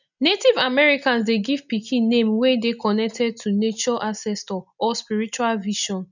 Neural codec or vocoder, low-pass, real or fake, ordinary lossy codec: none; 7.2 kHz; real; none